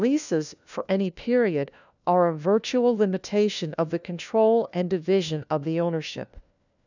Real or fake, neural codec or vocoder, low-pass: fake; codec, 16 kHz, 1 kbps, FunCodec, trained on LibriTTS, 50 frames a second; 7.2 kHz